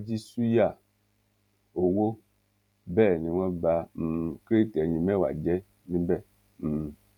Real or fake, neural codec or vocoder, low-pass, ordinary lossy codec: fake; vocoder, 44.1 kHz, 128 mel bands every 512 samples, BigVGAN v2; 19.8 kHz; none